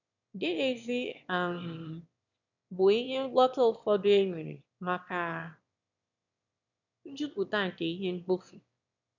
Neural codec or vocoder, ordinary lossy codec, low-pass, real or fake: autoencoder, 22.05 kHz, a latent of 192 numbers a frame, VITS, trained on one speaker; none; 7.2 kHz; fake